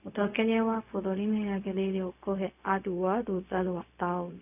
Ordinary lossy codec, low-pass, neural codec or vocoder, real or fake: none; 3.6 kHz; codec, 16 kHz, 0.4 kbps, LongCat-Audio-Codec; fake